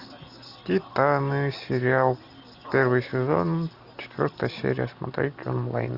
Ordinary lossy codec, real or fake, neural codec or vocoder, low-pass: Opus, 64 kbps; real; none; 5.4 kHz